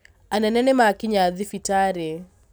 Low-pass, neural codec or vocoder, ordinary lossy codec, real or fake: none; none; none; real